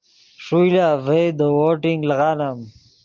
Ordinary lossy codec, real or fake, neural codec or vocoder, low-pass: Opus, 24 kbps; real; none; 7.2 kHz